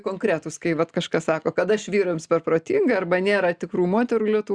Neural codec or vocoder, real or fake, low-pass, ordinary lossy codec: none; real; 9.9 kHz; Opus, 64 kbps